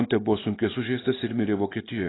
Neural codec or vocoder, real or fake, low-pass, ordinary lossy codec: none; real; 7.2 kHz; AAC, 16 kbps